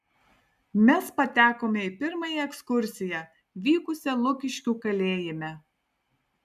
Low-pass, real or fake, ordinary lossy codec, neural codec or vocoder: 14.4 kHz; real; MP3, 96 kbps; none